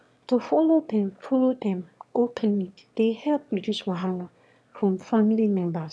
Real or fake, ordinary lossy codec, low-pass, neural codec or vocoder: fake; none; none; autoencoder, 22.05 kHz, a latent of 192 numbers a frame, VITS, trained on one speaker